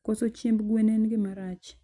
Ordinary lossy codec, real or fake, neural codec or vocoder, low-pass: none; real; none; 10.8 kHz